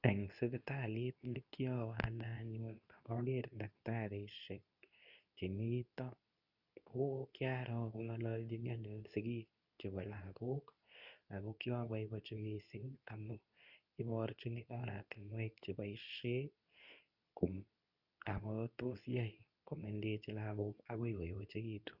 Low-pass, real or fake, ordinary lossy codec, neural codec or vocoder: 5.4 kHz; fake; none; codec, 24 kHz, 0.9 kbps, WavTokenizer, medium speech release version 2